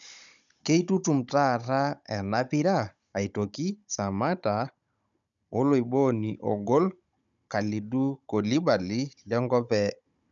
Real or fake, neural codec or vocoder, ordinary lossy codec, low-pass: fake; codec, 16 kHz, 16 kbps, FunCodec, trained on Chinese and English, 50 frames a second; none; 7.2 kHz